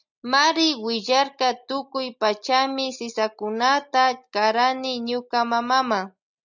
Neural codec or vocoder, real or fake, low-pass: none; real; 7.2 kHz